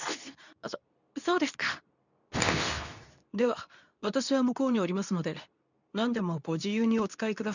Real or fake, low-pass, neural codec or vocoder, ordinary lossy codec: fake; 7.2 kHz; codec, 24 kHz, 0.9 kbps, WavTokenizer, medium speech release version 2; none